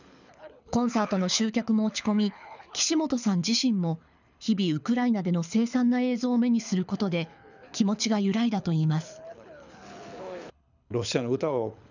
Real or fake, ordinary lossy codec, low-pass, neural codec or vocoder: fake; none; 7.2 kHz; codec, 24 kHz, 6 kbps, HILCodec